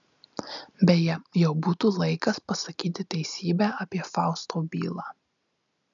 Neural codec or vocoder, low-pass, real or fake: none; 7.2 kHz; real